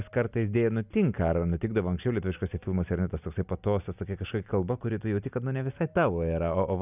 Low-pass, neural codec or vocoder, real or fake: 3.6 kHz; none; real